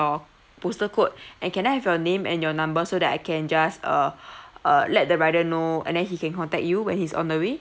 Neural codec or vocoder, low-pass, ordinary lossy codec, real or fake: none; none; none; real